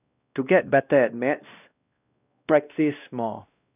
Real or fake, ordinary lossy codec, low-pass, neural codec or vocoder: fake; none; 3.6 kHz; codec, 16 kHz, 1 kbps, X-Codec, WavLM features, trained on Multilingual LibriSpeech